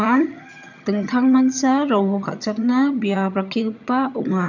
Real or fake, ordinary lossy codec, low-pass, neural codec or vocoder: fake; none; 7.2 kHz; vocoder, 22.05 kHz, 80 mel bands, HiFi-GAN